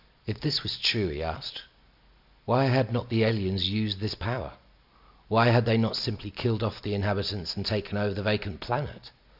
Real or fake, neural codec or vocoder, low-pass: real; none; 5.4 kHz